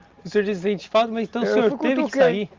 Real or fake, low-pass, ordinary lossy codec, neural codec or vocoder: real; 7.2 kHz; Opus, 32 kbps; none